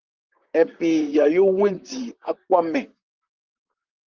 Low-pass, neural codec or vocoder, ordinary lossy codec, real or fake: 7.2 kHz; vocoder, 44.1 kHz, 128 mel bands, Pupu-Vocoder; Opus, 32 kbps; fake